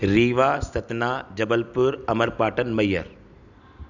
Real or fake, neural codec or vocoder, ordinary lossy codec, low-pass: real; none; none; 7.2 kHz